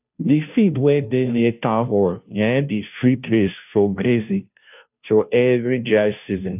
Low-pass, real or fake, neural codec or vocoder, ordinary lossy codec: 3.6 kHz; fake; codec, 16 kHz, 0.5 kbps, FunCodec, trained on Chinese and English, 25 frames a second; none